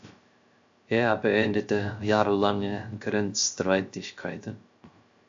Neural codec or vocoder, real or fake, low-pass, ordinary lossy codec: codec, 16 kHz, 0.3 kbps, FocalCodec; fake; 7.2 kHz; AAC, 64 kbps